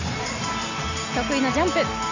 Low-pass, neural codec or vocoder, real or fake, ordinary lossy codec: 7.2 kHz; none; real; none